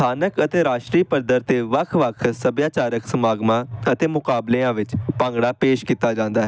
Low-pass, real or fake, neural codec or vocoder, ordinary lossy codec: none; real; none; none